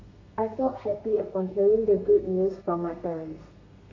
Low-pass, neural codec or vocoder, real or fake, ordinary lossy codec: 7.2 kHz; codec, 32 kHz, 1.9 kbps, SNAC; fake; MP3, 48 kbps